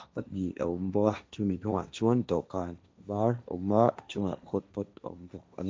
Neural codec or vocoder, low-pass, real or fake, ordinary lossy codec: codec, 16 kHz, 1.1 kbps, Voila-Tokenizer; none; fake; none